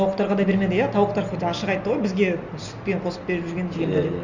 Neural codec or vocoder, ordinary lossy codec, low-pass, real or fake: none; Opus, 64 kbps; 7.2 kHz; real